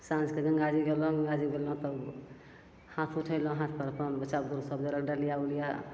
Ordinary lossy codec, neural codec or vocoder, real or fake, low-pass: none; none; real; none